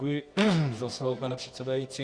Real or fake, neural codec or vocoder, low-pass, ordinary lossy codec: fake; codec, 24 kHz, 0.9 kbps, WavTokenizer, medium music audio release; 9.9 kHz; Opus, 64 kbps